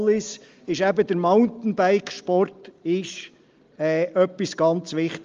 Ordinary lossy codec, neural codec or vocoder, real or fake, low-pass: Opus, 32 kbps; none; real; 7.2 kHz